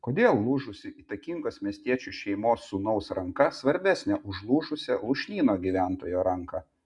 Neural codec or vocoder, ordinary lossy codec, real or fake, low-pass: none; MP3, 96 kbps; real; 10.8 kHz